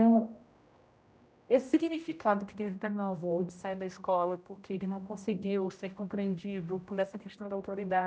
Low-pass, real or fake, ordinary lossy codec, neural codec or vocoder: none; fake; none; codec, 16 kHz, 0.5 kbps, X-Codec, HuBERT features, trained on general audio